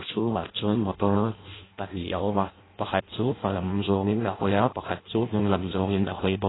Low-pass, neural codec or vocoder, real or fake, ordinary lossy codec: 7.2 kHz; codec, 16 kHz in and 24 kHz out, 0.6 kbps, FireRedTTS-2 codec; fake; AAC, 16 kbps